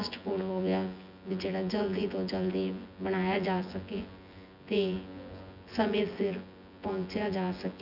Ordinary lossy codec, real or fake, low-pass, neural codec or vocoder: none; fake; 5.4 kHz; vocoder, 24 kHz, 100 mel bands, Vocos